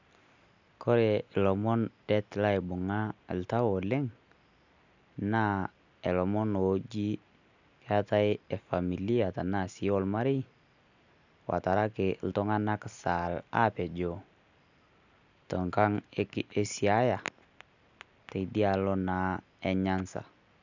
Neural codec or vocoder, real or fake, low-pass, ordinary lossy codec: none; real; 7.2 kHz; none